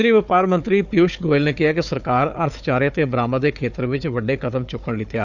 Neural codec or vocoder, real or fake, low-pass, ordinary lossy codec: codec, 16 kHz, 4 kbps, FunCodec, trained on Chinese and English, 50 frames a second; fake; 7.2 kHz; none